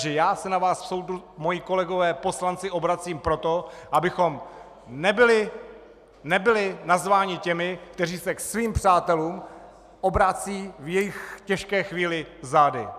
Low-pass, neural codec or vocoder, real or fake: 14.4 kHz; none; real